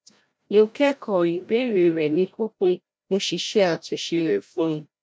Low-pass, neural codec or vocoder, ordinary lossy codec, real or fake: none; codec, 16 kHz, 0.5 kbps, FreqCodec, larger model; none; fake